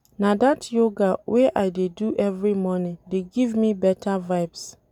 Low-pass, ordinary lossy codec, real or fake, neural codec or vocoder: 19.8 kHz; none; real; none